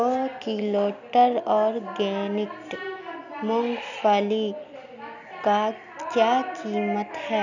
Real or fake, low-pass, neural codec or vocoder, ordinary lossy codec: real; 7.2 kHz; none; none